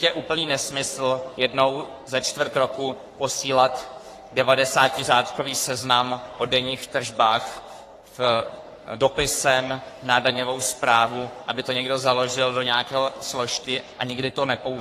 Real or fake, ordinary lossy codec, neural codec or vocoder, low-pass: fake; AAC, 48 kbps; codec, 44.1 kHz, 3.4 kbps, Pupu-Codec; 14.4 kHz